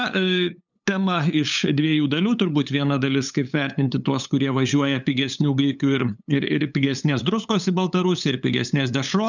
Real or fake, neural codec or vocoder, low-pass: fake; codec, 16 kHz, 8 kbps, FunCodec, trained on LibriTTS, 25 frames a second; 7.2 kHz